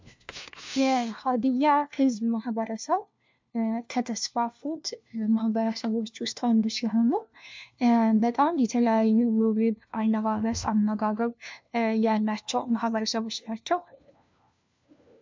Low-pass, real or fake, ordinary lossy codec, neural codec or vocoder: 7.2 kHz; fake; MP3, 64 kbps; codec, 16 kHz, 1 kbps, FunCodec, trained on LibriTTS, 50 frames a second